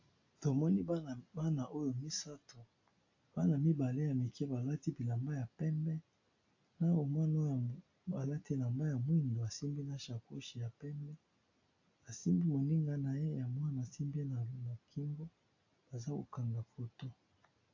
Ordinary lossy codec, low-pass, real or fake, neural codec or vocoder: AAC, 48 kbps; 7.2 kHz; real; none